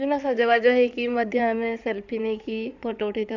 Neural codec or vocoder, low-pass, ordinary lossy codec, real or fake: codec, 16 kHz in and 24 kHz out, 2.2 kbps, FireRedTTS-2 codec; 7.2 kHz; none; fake